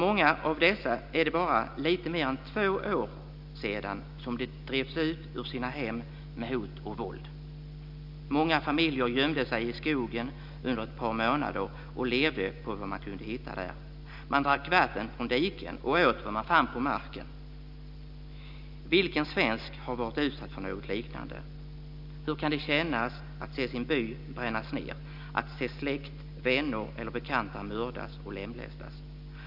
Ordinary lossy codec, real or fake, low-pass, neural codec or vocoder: none; real; 5.4 kHz; none